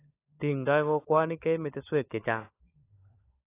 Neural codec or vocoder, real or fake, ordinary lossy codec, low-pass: codec, 16 kHz, 16 kbps, FunCodec, trained on LibriTTS, 50 frames a second; fake; AAC, 24 kbps; 3.6 kHz